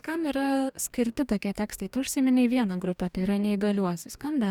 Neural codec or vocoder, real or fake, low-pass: codec, 44.1 kHz, 2.6 kbps, DAC; fake; 19.8 kHz